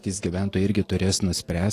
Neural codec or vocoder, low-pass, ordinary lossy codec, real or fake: none; 14.4 kHz; AAC, 48 kbps; real